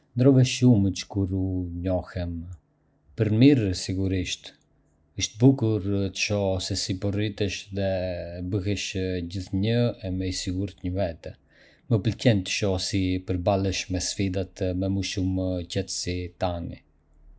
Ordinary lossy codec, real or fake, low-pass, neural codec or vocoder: none; real; none; none